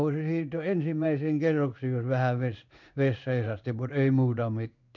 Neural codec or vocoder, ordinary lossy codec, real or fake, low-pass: codec, 16 kHz in and 24 kHz out, 1 kbps, XY-Tokenizer; none; fake; 7.2 kHz